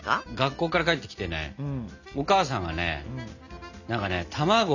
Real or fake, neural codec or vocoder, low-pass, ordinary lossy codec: real; none; 7.2 kHz; none